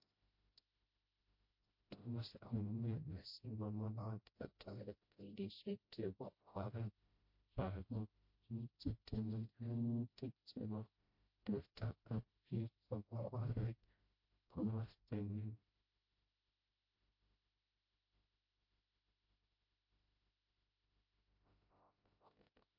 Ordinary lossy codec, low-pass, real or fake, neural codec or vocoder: MP3, 32 kbps; 5.4 kHz; fake; codec, 16 kHz, 0.5 kbps, FreqCodec, smaller model